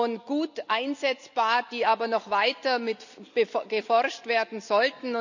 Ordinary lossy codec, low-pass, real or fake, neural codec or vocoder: none; 7.2 kHz; real; none